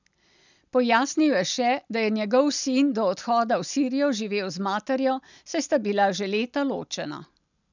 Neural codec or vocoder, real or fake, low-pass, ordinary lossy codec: none; real; 7.2 kHz; none